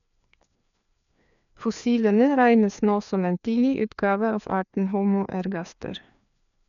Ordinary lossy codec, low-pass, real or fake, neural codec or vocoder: none; 7.2 kHz; fake; codec, 16 kHz, 2 kbps, FreqCodec, larger model